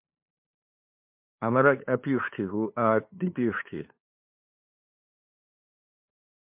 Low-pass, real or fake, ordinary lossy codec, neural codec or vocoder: 3.6 kHz; fake; MP3, 32 kbps; codec, 16 kHz, 2 kbps, FunCodec, trained on LibriTTS, 25 frames a second